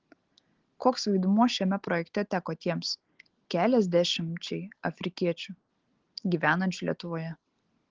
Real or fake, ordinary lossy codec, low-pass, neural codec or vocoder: real; Opus, 16 kbps; 7.2 kHz; none